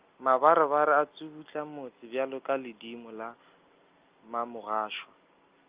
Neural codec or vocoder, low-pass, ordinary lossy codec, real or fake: none; 3.6 kHz; Opus, 24 kbps; real